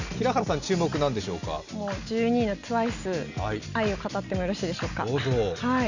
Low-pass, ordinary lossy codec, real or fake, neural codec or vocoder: 7.2 kHz; none; real; none